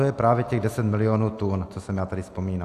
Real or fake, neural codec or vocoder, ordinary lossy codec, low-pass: real; none; AAC, 64 kbps; 14.4 kHz